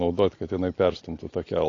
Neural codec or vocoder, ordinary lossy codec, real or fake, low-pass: none; AAC, 32 kbps; real; 7.2 kHz